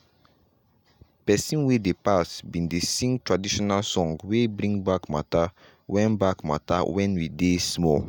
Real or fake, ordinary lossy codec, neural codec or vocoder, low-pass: real; none; none; none